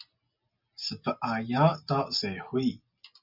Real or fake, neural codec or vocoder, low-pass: real; none; 5.4 kHz